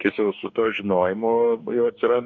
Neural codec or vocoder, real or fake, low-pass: codec, 44.1 kHz, 2.6 kbps, DAC; fake; 7.2 kHz